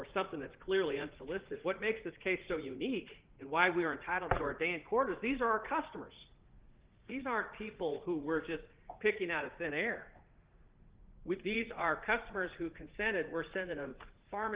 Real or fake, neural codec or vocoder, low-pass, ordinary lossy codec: fake; vocoder, 44.1 kHz, 80 mel bands, Vocos; 3.6 kHz; Opus, 16 kbps